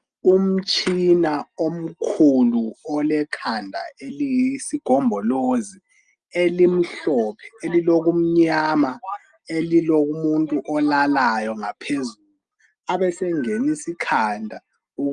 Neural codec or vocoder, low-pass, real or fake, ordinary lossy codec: none; 9.9 kHz; real; Opus, 32 kbps